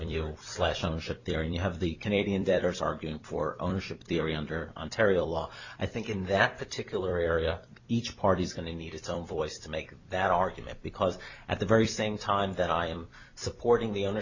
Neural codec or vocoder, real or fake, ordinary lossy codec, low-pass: vocoder, 44.1 kHz, 128 mel bands every 256 samples, BigVGAN v2; fake; Opus, 64 kbps; 7.2 kHz